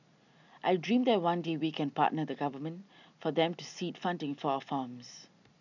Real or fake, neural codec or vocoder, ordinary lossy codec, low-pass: real; none; none; 7.2 kHz